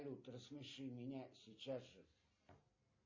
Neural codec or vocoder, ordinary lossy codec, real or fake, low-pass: none; MP3, 32 kbps; real; 7.2 kHz